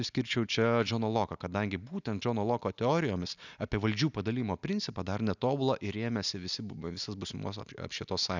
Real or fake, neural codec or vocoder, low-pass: real; none; 7.2 kHz